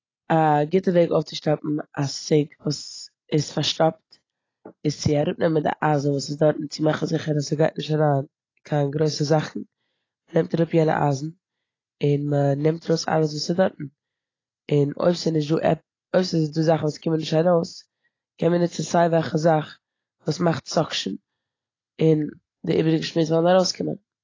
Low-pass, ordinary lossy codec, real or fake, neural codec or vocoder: 7.2 kHz; AAC, 32 kbps; real; none